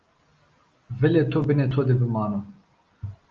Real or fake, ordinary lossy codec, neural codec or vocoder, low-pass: real; Opus, 32 kbps; none; 7.2 kHz